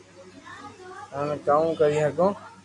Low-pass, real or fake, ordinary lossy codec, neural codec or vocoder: 10.8 kHz; real; AAC, 48 kbps; none